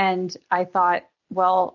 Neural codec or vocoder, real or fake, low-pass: none; real; 7.2 kHz